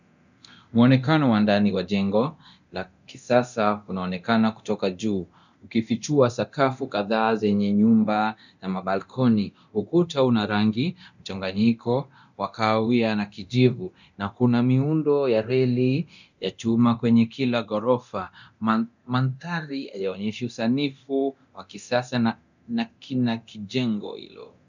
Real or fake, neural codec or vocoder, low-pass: fake; codec, 24 kHz, 0.9 kbps, DualCodec; 7.2 kHz